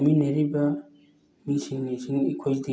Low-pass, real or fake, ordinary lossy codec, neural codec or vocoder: none; real; none; none